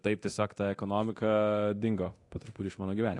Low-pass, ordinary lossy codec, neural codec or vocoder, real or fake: 10.8 kHz; AAC, 48 kbps; codec, 24 kHz, 0.9 kbps, DualCodec; fake